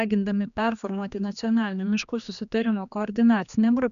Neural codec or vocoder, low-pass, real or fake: codec, 16 kHz, 2 kbps, X-Codec, HuBERT features, trained on general audio; 7.2 kHz; fake